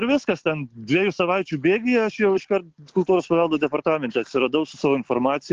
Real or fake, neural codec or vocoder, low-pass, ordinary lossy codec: real; none; 7.2 kHz; Opus, 16 kbps